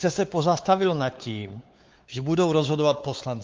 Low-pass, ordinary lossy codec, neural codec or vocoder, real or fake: 7.2 kHz; Opus, 24 kbps; codec, 16 kHz, 4 kbps, X-Codec, WavLM features, trained on Multilingual LibriSpeech; fake